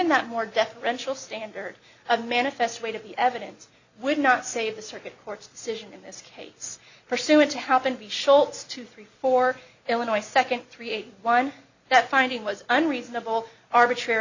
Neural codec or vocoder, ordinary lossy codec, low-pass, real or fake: none; Opus, 64 kbps; 7.2 kHz; real